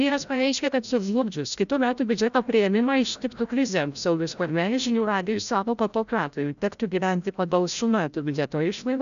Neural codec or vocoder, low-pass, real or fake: codec, 16 kHz, 0.5 kbps, FreqCodec, larger model; 7.2 kHz; fake